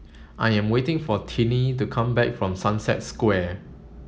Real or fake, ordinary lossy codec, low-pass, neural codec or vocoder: real; none; none; none